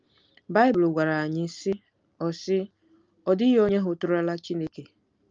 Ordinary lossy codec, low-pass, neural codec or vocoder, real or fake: Opus, 24 kbps; 7.2 kHz; none; real